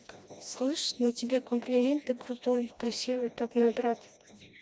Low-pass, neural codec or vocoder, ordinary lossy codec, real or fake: none; codec, 16 kHz, 1 kbps, FreqCodec, smaller model; none; fake